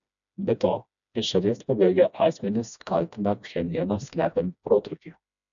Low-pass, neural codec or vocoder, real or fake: 7.2 kHz; codec, 16 kHz, 1 kbps, FreqCodec, smaller model; fake